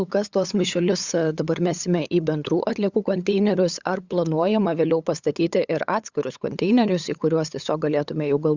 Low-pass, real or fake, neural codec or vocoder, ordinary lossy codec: 7.2 kHz; fake; codec, 16 kHz, 8 kbps, FunCodec, trained on LibriTTS, 25 frames a second; Opus, 64 kbps